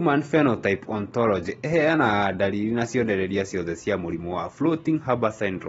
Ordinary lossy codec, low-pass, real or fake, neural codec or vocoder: AAC, 24 kbps; 19.8 kHz; real; none